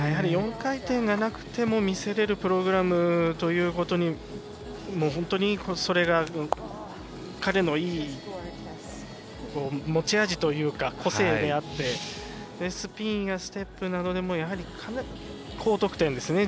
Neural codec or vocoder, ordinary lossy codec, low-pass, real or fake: none; none; none; real